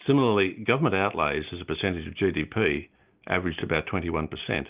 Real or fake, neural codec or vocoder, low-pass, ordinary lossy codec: real; none; 3.6 kHz; Opus, 32 kbps